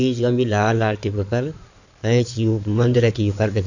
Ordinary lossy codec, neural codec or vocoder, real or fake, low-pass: none; codec, 16 kHz in and 24 kHz out, 2.2 kbps, FireRedTTS-2 codec; fake; 7.2 kHz